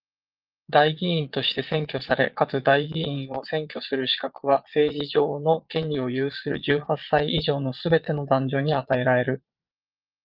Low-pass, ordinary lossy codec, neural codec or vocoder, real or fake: 5.4 kHz; Opus, 24 kbps; vocoder, 22.05 kHz, 80 mel bands, Vocos; fake